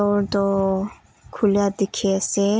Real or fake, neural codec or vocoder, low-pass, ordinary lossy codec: real; none; none; none